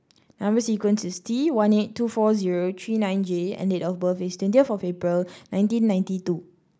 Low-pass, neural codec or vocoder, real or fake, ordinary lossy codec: none; none; real; none